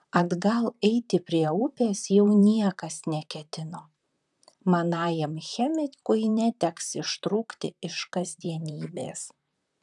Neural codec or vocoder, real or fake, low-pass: vocoder, 44.1 kHz, 128 mel bands, Pupu-Vocoder; fake; 10.8 kHz